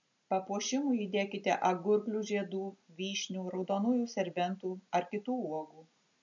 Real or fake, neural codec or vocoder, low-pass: real; none; 7.2 kHz